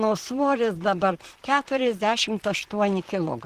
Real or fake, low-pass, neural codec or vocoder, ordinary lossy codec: fake; 14.4 kHz; vocoder, 44.1 kHz, 128 mel bands, Pupu-Vocoder; Opus, 16 kbps